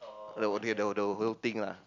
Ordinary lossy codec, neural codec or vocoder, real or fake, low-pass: none; none; real; 7.2 kHz